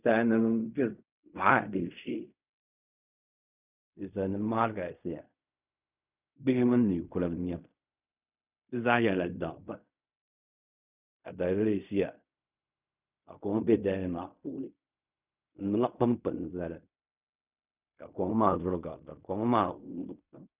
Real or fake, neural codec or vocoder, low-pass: fake; codec, 16 kHz in and 24 kHz out, 0.4 kbps, LongCat-Audio-Codec, fine tuned four codebook decoder; 3.6 kHz